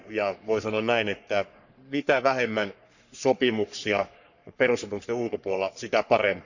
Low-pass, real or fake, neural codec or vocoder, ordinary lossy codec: 7.2 kHz; fake; codec, 44.1 kHz, 3.4 kbps, Pupu-Codec; none